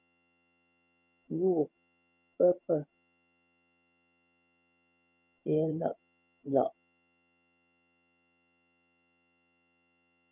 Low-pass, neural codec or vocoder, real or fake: 3.6 kHz; vocoder, 22.05 kHz, 80 mel bands, HiFi-GAN; fake